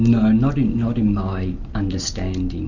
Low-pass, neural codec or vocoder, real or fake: 7.2 kHz; none; real